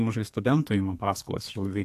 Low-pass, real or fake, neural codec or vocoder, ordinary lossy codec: 14.4 kHz; fake; codec, 32 kHz, 1.9 kbps, SNAC; AAC, 64 kbps